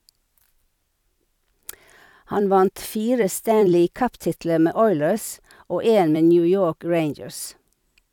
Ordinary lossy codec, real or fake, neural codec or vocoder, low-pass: none; fake; vocoder, 44.1 kHz, 128 mel bands every 256 samples, BigVGAN v2; 19.8 kHz